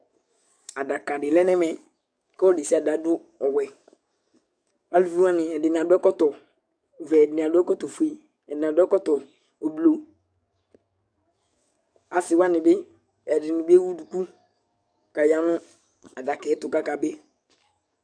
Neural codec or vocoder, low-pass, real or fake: codec, 44.1 kHz, 7.8 kbps, DAC; 9.9 kHz; fake